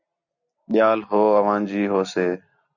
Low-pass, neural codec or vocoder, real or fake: 7.2 kHz; none; real